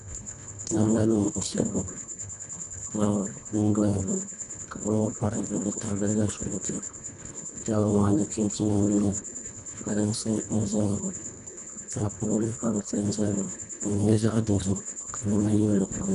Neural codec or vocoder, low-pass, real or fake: codec, 24 kHz, 1.5 kbps, HILCodec; 10.8 kHz; fake